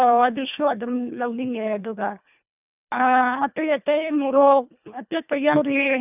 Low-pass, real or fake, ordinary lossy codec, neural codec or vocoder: 3.6 kHz; fake; none; codec, 24 kHz, 1.5 kbps, HILCodec